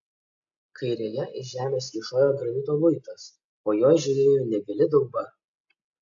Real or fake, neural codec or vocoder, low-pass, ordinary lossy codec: real; none; 7.2 kHz; AAC, 64 kbps